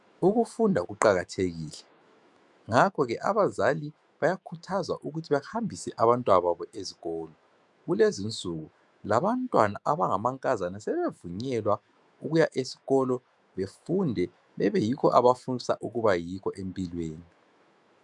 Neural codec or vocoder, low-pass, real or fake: autoencoder, 48 kHz, 128 numbers a frame, DAC-VAE, trained on Japanese speech; 10.8 kHz; fake